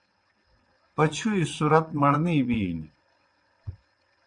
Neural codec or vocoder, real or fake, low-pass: vocoder, 22.05 kHz, 80 mel bands, WaveNeXt; fake; 9.9 kHz